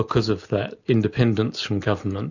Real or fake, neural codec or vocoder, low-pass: real; none; 7.2 kHz